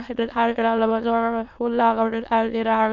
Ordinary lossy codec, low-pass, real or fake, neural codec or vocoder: MP3, 64 kbps; 7.2 kHz; fake; autoencoder, 22.05 kHz, a latent of 192 numbers a frame, VITS, trained on many speakers